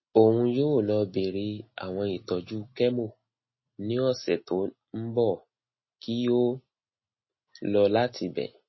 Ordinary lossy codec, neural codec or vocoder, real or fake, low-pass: MP3, 24 kbps; none; real; 7.2 kHz